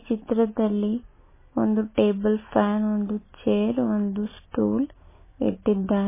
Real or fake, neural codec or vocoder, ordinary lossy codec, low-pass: real; none; MP3, 16 kbps; 3.6 kHz